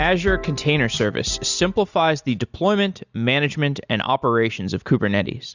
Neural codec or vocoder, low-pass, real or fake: none; 7.2 kHz; real